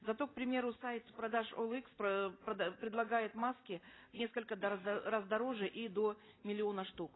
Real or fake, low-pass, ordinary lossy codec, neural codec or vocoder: real; 7.2 kHz; AAC, 16 kbps; none